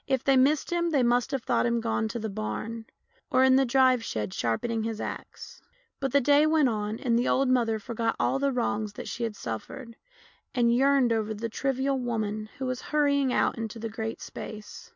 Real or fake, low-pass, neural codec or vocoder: real; 7.2 kHz; none